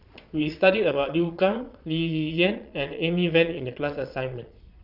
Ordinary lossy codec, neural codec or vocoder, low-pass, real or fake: none; vocoder, 22.05 kHz, 80 mel bands, Vocos; 5.4 kHz; fake